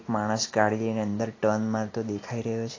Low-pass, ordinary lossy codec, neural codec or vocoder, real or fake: 7.2 kHz; AAC, 32 kbps; none; real